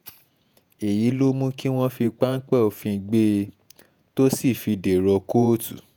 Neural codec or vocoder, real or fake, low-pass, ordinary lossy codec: vocoder, 48 kHz, 128 mel bands, Vocos; fake; none; none